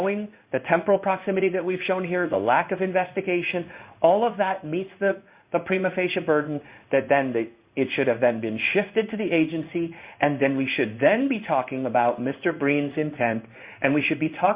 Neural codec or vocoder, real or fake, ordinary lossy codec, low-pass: codec, 16 kHz in and 24 kHz out, 1 kbps, XY-Tokenizer; fake; Opus, 64 kbps; 3.6 kHz